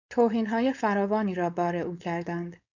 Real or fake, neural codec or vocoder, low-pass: fake; codec, 16 kHz, 4.8 kbps, FACodec; 7.2 kHz